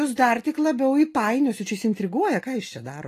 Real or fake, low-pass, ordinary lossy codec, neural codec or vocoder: real; 14.4 kHz; AAC, 48 kbps; none